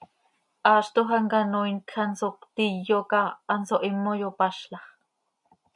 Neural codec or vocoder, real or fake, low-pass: none; real; 10.8 kHz